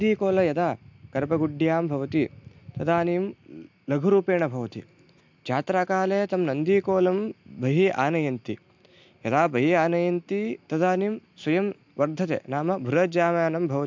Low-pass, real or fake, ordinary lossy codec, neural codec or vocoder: 7.2 kHz; real; MP3, 64 kbps; none